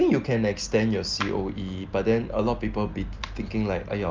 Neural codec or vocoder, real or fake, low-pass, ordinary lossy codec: none; real; 7.2 kHz; Opus, 32 kbps